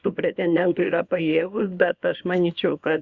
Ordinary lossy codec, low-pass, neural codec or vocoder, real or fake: Opus, 64 kbps; 7.2 kHz; codec, 24 kHz, 0.9 kbps, WavTokenizer, small release; fake